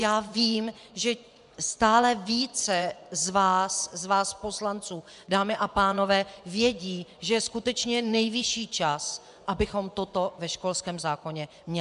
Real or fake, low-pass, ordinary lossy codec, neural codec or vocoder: fake; 10.8 kHz; MP3, 96 kbps; vocoder, 24 kHz, 100 mel bands, Vocos